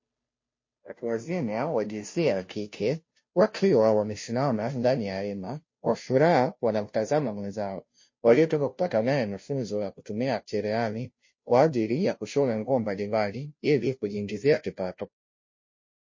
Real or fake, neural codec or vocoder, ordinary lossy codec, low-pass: fake; codec, 16 kHz, 0.5 kbps, FunCodec, trained on Chinese and English, 25 frames a second; MP3, 32 kbps; 7.2 kHz